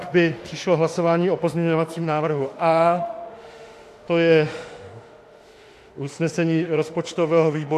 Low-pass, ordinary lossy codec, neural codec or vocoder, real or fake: 14.4 kHz; AAC, 64 kbps; autoencoder, 48 kHz, 32 numbers a frame, DAC-VAE, trained on Japanese speech; fake